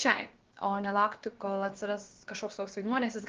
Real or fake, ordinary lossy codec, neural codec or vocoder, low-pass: fake; Opus, 16 kbps; codec, 16 kHz, about 1 kbps, DyCAST, with the encoder's durations; 7.2 kHz